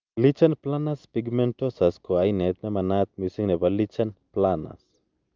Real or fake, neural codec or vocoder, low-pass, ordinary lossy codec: real; none; 7.2 kHz; Opus, 24 kbps